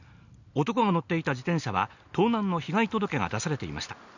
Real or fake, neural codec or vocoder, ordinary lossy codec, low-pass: real; none; none; 7.2 kHz